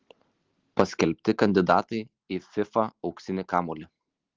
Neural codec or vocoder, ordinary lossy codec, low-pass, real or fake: none; Opus, 16 kbps; 7.2 kHz; real